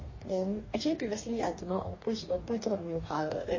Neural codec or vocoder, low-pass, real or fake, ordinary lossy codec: codec, 44.1 kHz, 2.6 kbps, DAC; 7.2 kHz; fake; MP3, 32 kbps